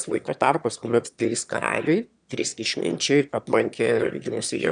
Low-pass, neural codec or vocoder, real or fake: 9.9 kHz; autoencoder, 22.05 kHz, a latent of 192 numbers a frame, VITS, trained on one speaker; fake